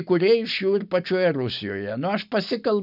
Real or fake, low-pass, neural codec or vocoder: real; 5.4 kHz; none